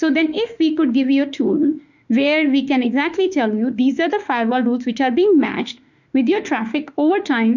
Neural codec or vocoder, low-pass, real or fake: autoencoder, 48 kHz, 32 numbers a frame, DAC-VAE, trained on Japanese speech; 7.2 kHz; fake